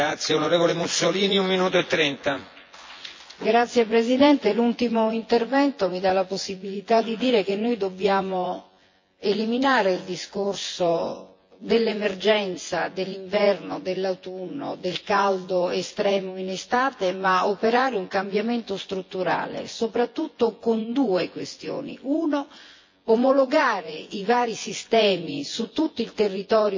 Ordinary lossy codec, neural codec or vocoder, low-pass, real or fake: MP3, 32 kbps; vocoder, 24 kHz, 100 mel bands, Vocos; 7.2 kHz; fake